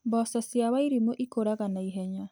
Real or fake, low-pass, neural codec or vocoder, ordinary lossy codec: real; none; none; none